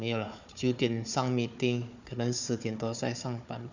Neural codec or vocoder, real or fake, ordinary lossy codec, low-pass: codec, 16 kHz, 4 kbps, FunCodec, trained on Chinese and English, 50 frames a second; fake; none; 7.2 kHz